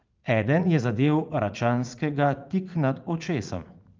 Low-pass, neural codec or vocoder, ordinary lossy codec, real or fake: 7.2 kHz; vocoder, 44.1 kHz, 80 mel bands, Vocos; Opus, 24 kbps; fake